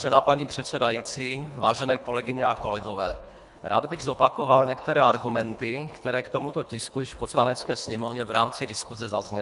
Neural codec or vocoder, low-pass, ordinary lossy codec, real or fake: codec, 24 kHz, 1.5 kbps, HILCodec; 10.8 kHz; AAC, 96 kbps; fake